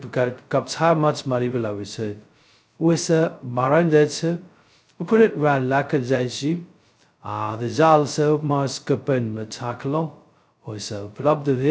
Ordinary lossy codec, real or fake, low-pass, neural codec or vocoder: none; fake; none; codec, 16 kHz, 0.2 kbps, FocalCodec